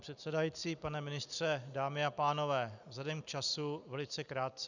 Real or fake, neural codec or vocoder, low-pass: real; none; 7.2 kHz